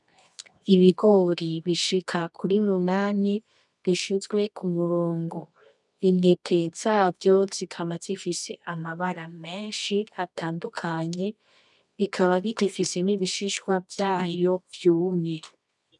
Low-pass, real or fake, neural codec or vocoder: 10.8 kHz; fake; codec, 24 kHz, 0.9 kbps, WavTokenizer, medium music audio release